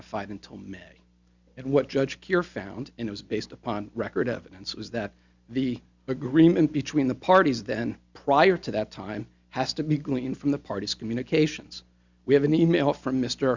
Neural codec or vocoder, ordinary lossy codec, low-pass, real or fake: none; Opus, 64 kbps; 7.2 kHz; real